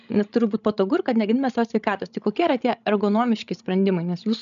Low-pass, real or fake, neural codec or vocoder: 7.2 kHz; fake; codec, 16 kHz, 16 kbps, FreqCodec, larger model